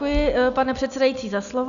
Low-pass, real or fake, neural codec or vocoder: 7.2 kHz; real; none